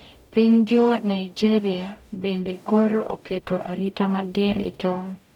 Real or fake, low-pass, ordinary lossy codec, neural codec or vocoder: fake; 19.8 kHz; none; codec, 44.1 kHz, 0.9 kbps, DAC